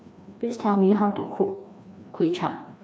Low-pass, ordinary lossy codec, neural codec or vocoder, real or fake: none; none; codec, 16 kHz, 1 kbps, FreqCodec, larger model; fake